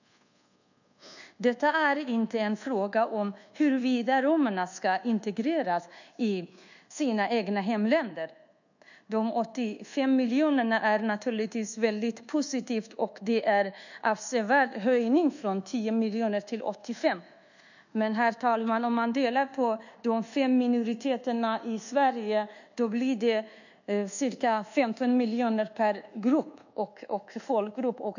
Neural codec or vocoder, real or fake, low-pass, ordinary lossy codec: codec, 24 kHz, 1.2 kbps, DualCodec; fake; 7.2 kHz; none